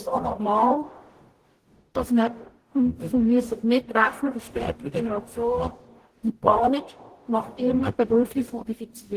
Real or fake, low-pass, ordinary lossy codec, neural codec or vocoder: fake; 14.4 kHz; Opus, 16 kbps; codec, 44.1 kHz, 0.9 kbps, DAC